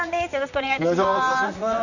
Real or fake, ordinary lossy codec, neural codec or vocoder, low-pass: fake; none; vocoder, 44.1 kHz, 128 mel bands, Pupu-Vocoder; 7.2 kHz